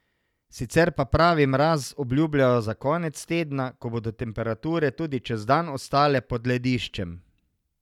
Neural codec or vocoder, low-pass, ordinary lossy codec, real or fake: none; 19.8 kHz; none; real